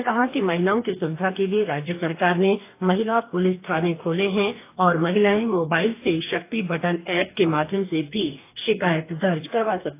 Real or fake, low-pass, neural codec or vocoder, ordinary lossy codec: fake; 3.6 kHz; codec, 44.1 kHz, 2.6 kbps, DAC; AAC, 24 kbps